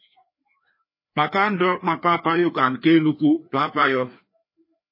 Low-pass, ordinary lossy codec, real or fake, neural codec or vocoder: 5.4 kHz; MP3, 24 kbps; fake; codec, 16 kHz, 2 kbps, FreqCodec, larger model